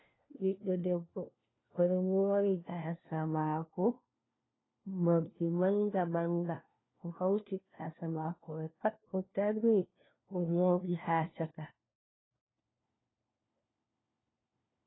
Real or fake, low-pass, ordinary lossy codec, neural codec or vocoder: fake; 7.2 kHz; AAC, 16 kbps; codec, 16 kHz, 1 kbps, FunCodec, trained on LibriTTS, 50 frames a second